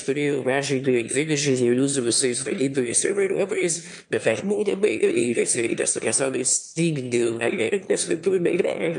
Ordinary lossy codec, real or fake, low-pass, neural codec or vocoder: MP3, 64 kbps; fake; 9.9 kHz; autoencoder, 22.05 kHz, a latent of 192 numbers a frame, VITS, trained on one speaker